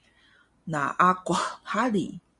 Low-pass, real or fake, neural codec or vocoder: 10.8 kHz; real; none